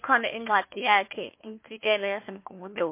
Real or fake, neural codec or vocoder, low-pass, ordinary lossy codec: fake; codec, 16 kHz, 1 kbps, FunCodec, trained on Chinese and English, 50 frames a second; 3.6 kHz; MP3, 24 kbps